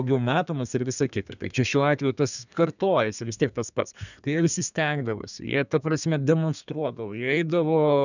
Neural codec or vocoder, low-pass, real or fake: codec, 32 kHz, 1.9 kbps, SNAC; 7.2 kHz; fake